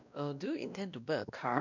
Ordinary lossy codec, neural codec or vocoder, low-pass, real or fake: none; codec, 16 kHz, 1 kbps, X-Codec, WavLM features, trained on Multilingual LibriSpeech; 7.2 kHz; fake